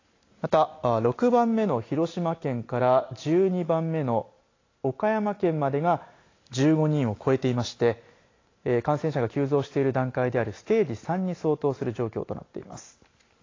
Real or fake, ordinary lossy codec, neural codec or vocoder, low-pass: real; AAC, 32 kbps; none; 7.2 kHz